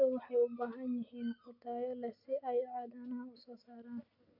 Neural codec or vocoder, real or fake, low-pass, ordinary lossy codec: none; real; 5.4 kHz; MP3, 48 kbps